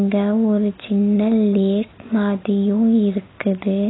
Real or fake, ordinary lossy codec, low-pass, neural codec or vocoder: real; AAC, 16 kbps; 7.2 kHz; none